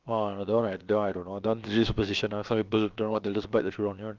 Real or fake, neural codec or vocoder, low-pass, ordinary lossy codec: fake; codec, 16 kHz, about 1 kbps, DyCAST, with the encoder's durations; 7.2 kHz; Opus, 24 kbps